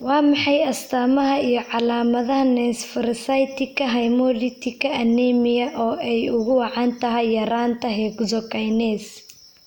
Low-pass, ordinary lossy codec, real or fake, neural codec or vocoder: 19.8 kHz; none; real; none